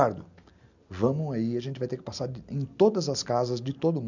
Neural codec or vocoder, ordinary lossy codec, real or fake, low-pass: none; none; real; 7.2 kHz